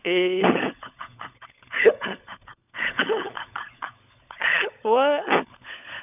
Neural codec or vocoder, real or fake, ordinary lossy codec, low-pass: codec, 16 kHz, 16 kbps, FunCodec, trained on LibriTTS, 50 frames a second; fake; none; 3.6 kHz